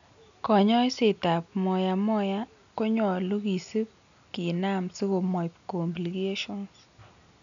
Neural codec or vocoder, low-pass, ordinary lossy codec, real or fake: none; 7.2 kHz; none; real